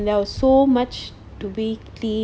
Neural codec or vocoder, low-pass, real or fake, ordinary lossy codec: none; none; real; none